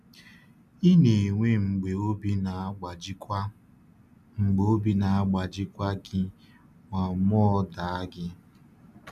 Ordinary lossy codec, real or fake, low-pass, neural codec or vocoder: none; real; 14.4 kHz; none